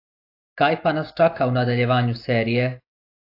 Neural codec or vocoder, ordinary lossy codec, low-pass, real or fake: none; AAC, 32 kbps; 5.4 kHz; real